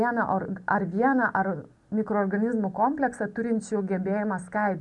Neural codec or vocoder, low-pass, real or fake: none; 10.8 kHz; real